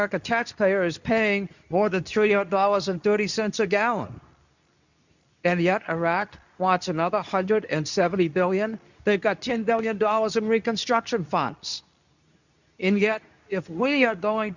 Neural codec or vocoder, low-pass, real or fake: codec, 24 kHz, 0.9 kbps, WavTokenizer, medium speech release version 2; 7.2 kHz; fake